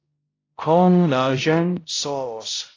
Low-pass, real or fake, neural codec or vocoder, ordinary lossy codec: 7.2 kHz; fake; codec, 16 kHz, 0.5 kbps, X-Codec, HuBERT features, trained on balanced general audio; AAC, 32 kbps